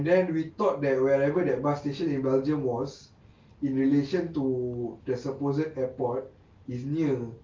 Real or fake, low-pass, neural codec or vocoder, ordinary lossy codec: real; 7.2 kHz; none; Opus, 24 kbps